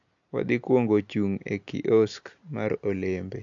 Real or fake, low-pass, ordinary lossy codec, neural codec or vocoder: real; 7.2 kHz; AAC, 64 kbps; none